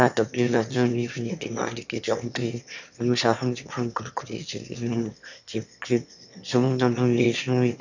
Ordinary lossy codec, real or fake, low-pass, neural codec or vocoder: none; fake; 7.2 kHz; autoencoder, 22.05 kHz, a latent of 192 numbers a frame, VITS, trained on one speaker